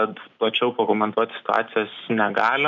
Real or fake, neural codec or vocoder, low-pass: real; none; 7.2 kHz